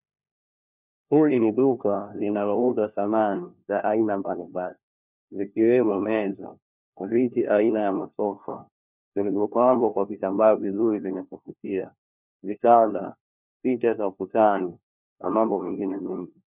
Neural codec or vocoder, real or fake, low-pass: codec, 16 kHz, 1 kbps, FunCodec, trained on LibriTTS, 50 frames a second; fake; 3.6 kHz